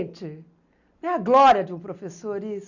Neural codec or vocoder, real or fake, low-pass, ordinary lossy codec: none; real; 7.2 kHz; none